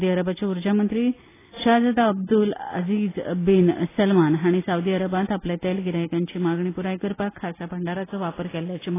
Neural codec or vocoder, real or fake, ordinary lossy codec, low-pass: none; real; AAC, 16 kbps; 3.6 kHz